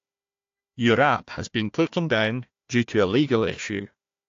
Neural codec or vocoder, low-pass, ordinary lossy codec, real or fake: codec, 16 kHz, 1 kbps, FunCodec, trained on Chinese and English, 50 frames a second; 7.2 kHz; AAC, 48 kbps; fake